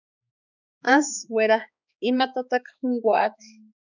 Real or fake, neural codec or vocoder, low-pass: fake; codec, 16 kHz, 4 kbps, X-Codec, HuBERT features, trained on balanced general audio; 7.2 kHz